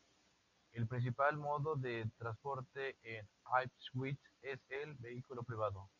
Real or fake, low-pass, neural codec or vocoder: real; 7.2 kHz; none